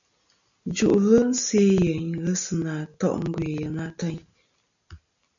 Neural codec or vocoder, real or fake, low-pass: none; real; 7.2 kHz